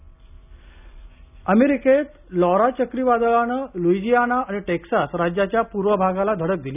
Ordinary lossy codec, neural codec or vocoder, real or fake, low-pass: none; none; real; 3.6 kHz